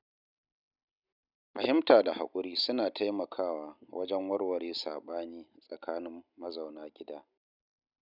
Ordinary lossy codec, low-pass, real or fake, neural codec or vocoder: none; 5.4 kHz; real; none